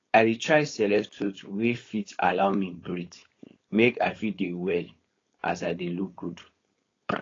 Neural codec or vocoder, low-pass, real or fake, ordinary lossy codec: codec, 16 kHz, 4.8 kbps, FACodec; 7.2 kHz; fake; AAC, 32 kbps